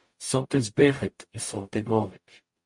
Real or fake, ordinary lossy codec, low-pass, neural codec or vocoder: fake; MP3, 48 kbps; 10.8 kHz; codec, 44.1 kHz, 0.9 kbps, DAC